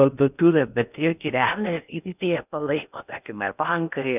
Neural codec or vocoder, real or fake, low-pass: codec, 16 kHz in and 24 kHz out, 0.6 kbps, FocalCodec, streaming, 2048 codes; fake; 3.6 kHz